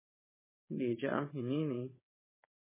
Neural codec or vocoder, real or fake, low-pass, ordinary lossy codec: vocoder, 22.05 kHz, 80 mel bands, Vocos; fake; 3.6 kHz; MP3, 16 kbps